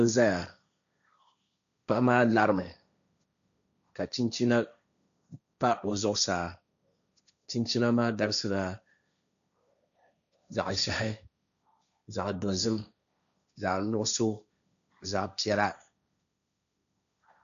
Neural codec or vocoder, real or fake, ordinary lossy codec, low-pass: codec, 16 kHz, 1.1 kbps, Voila-Tokenizer; fake; MP3, 96 kbps; 7.2 kHz